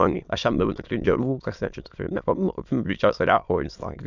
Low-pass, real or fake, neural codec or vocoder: 7.2 kHz; fake; autoencoder, 22.05 kHz, a latent of 192 numbers a frame, VITS, trained on many speakers